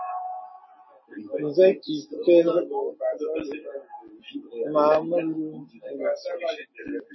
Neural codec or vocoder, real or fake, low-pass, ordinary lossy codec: none; real; 7.2 kHz; MP3, 24 kbps